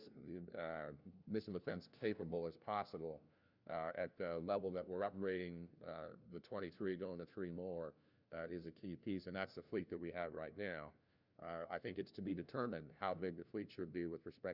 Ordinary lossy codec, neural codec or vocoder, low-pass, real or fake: Opus, 64 kbps; codec, 16 kHz, 1 kbps, FunCodec, trained on LibriTTS, 50 frames a second; 5.4 kHz; fake